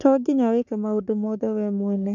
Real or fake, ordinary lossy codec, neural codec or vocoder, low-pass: fake; none; codec, 44.1 kHz, 3.4 kbps, Pupu-Codec; 7.2 kHz